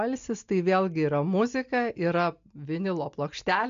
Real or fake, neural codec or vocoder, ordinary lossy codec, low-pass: real; none; MP3, 64 kbps; 7.2 kHz